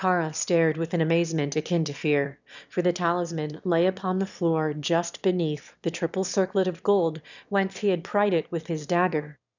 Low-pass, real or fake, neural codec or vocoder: 7.2 kHz; fake; autoencoder, 22.05 kHz, a latent of 192 numbers a frame, VITS, trained on one speaker